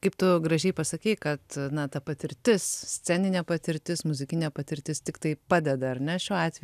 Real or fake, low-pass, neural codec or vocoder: real; 14.4 kHz; none